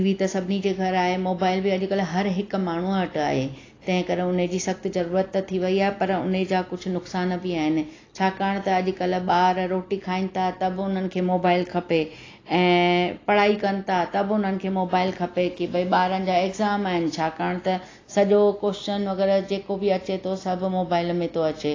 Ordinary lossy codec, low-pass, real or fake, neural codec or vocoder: AAC, 32 kbps; 7.2 kHz; real; none